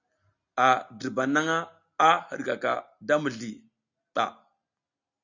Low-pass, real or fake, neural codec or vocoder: 7.2 kHz; real; none